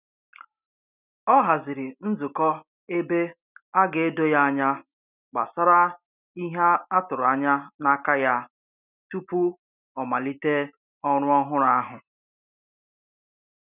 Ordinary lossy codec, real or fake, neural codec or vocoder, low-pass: none; real; none; 3.6 kHz